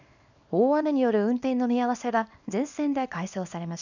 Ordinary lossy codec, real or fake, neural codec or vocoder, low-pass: none; fake; codec, 24 kHz, 0.9 kbps, WavTokenizer, small release; 7.2 kHz